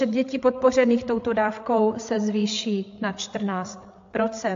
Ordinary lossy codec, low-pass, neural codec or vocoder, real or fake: AAC, 48 kbps; 7.2 kHz; codec, 16 kHz, 8 kbps, FreqCodec, larger model; fake